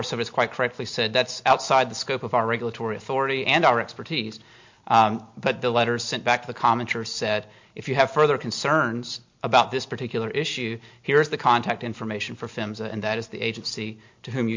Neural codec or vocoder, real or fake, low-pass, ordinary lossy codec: none; real; 7.2 kHz; MP3, 48 kbps